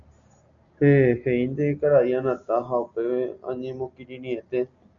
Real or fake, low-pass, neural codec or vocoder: real; 7.2 kHz; none